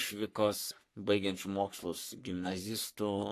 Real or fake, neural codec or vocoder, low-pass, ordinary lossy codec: fake; codec, 44.1 kHz, 3.4 kbps, Pupu-Codec; 14.4 kHz; AAC, 64 kbps